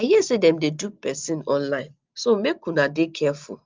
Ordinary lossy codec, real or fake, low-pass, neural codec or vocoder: Opus, 32 kbps; real; 7.2 kHz; none